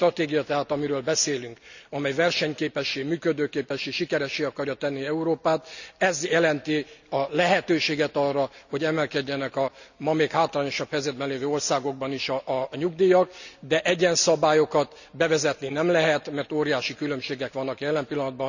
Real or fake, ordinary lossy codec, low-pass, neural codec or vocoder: real; none; 7.2 kHz; none